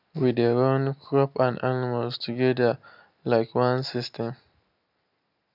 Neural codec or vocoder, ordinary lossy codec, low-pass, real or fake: none; none; 5.4 kHz; real